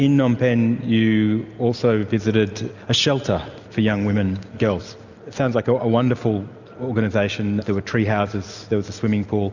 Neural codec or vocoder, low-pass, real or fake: none; 7.2 kHz; real